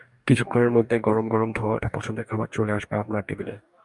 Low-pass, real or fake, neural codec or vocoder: 10.8 kHz; fake; codec, 44.1 kHz, 2.6 kbps, DAC